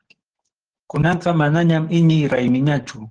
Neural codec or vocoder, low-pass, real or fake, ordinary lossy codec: codec, 44.1 kHz, 7.8 kbps, DAC; 9.9 kHz; fake; Opus, 16 kbps